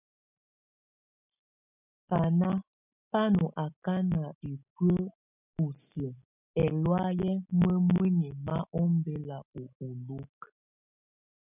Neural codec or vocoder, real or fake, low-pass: none; real; 3.6 kHz